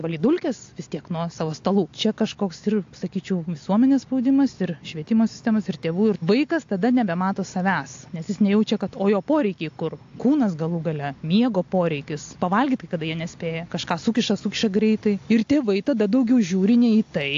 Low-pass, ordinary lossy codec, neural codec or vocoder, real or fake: 7.2 kHz; AAC, 48 kbps; none; real